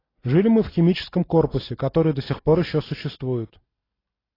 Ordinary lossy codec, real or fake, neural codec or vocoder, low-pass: AAC, 24 kbps; real; none; 5.4 kHz